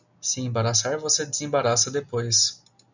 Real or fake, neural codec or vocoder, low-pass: real; none; 7.2 kHz